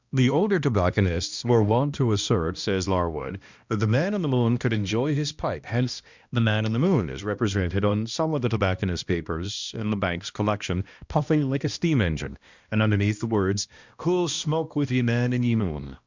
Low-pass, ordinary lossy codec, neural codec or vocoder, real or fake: 7.2 kHz; Opus, 64 kbps; codec, 16 kHz, 1 kbps, X-Codec, HuBERT features, trained on balanced general audio; fake